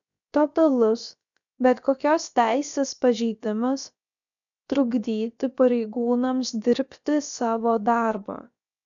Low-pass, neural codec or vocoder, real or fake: 7.2 kHz; codec, 16 kHz, about 1 kbps, DyCAST, with the encoder's durations; fake